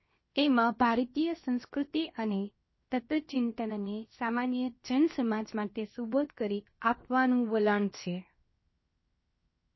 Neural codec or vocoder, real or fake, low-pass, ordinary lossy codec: codec, 16 kHz, 0.7 kbps, FocalCodec; fake; 7.2 kHz; MP3, 24 kbps